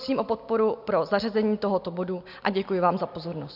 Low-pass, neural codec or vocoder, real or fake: 5.4 kHz; none; real